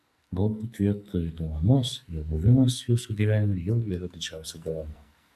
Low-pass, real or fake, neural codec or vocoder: 14.4 kHz; fake; codec, 44.1 kHz, 2.6 kbps, SNAC